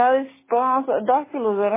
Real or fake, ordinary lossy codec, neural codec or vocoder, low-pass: real; MP3, 16 kbps; none; 3.6 kHz